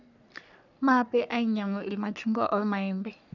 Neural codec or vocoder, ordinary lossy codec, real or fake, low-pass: codec, 44.1 kHz, 3.4 kbps, Pupu-Codec; none; fake; 7.2 kHz